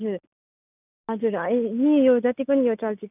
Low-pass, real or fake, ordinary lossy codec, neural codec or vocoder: 3.6 kHz; real; none; none